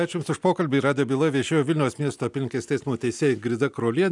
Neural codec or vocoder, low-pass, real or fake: none; 10.8 kHz; real